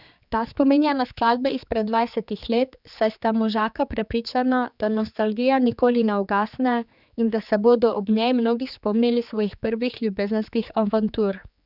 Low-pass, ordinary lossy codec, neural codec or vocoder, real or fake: 5.4 kHz; none; codec, 16 kHz, 4 kbps, X-Codec, HuBERT features, trained on general audio; fake